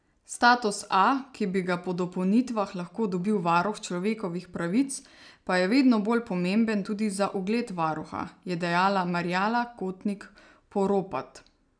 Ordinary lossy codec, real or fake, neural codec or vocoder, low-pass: none; real; none; 9.9 kHz